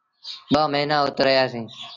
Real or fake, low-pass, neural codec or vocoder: real; 7.2 kHz; none